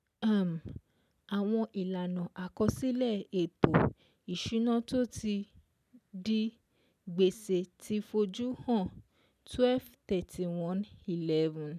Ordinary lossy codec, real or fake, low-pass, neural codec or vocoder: none; real; 14.4 kHz; none